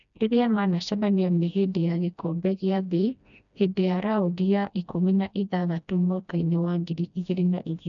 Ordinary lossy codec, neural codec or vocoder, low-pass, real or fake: none; codec, 16 kHz, 1 kbps, FreqCodec, smaller model; 7.2 kHz; fake